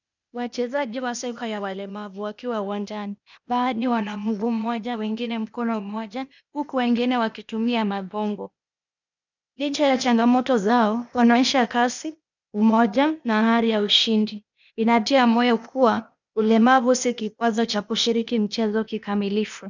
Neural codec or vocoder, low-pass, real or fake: codec, 16 kHz, 0.8 kbps, ZipCodec; 7.2 kHz; fake